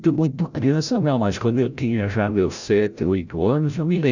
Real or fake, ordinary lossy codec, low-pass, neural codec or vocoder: fake; none; 7.2 kHz; codec, 16 kHz, 0.5 kbps, FreqCodec, larger model